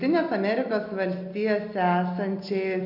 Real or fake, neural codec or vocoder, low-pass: real; none; 5.4 kHz